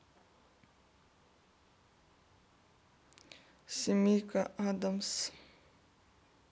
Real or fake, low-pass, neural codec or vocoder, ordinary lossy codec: real; none; none; none